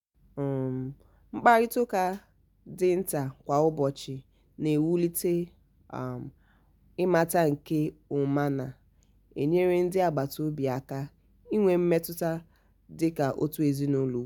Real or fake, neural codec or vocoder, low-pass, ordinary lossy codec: real; none; 19.8 kHz; none